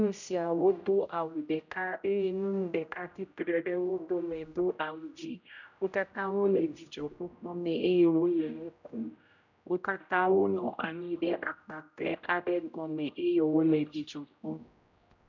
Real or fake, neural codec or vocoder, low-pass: fake; codec, 16 kHz, 0.5 kbps, X-Codec, HuBERT features, trained on general audio; 7.2 kHz